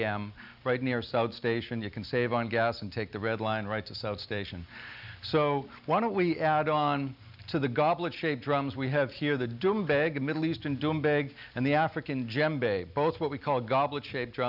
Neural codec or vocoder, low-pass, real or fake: none; 5.4 kHz; real